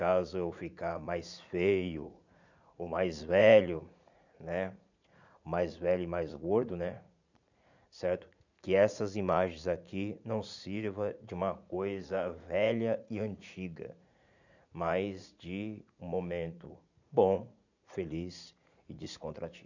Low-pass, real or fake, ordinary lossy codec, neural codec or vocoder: 7.2 kHz; fake; none; vocoder, 44.1 kHz, 80 mel bands, Vocos